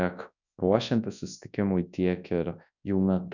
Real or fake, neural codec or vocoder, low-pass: fake; codec, 24 kHz, 0.9 kbps, WavTokenizer, large speech release; 7.2 kHz